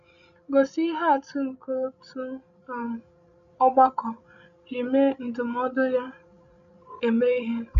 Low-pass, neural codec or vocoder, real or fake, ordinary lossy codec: 7.2 kHz; codec, 16 kHz, 16 kbps, FreqCodec, larger model; fake; none